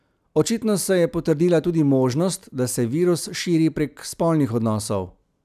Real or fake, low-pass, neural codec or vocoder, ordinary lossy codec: real; 14.4 kHz; none; none